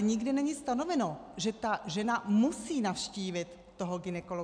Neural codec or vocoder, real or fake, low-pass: none; real; 9.9 kHz